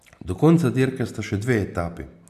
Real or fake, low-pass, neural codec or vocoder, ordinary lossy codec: real; 14.4 kHz; none; AAC, 96 kbps